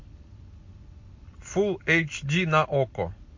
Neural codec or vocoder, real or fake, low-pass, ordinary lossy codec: none; real; 7.2 kHz; MP3, 48 kbps